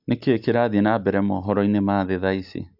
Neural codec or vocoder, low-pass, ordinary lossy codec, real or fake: none; 5.4 kHz; AAC, 48 kbps; real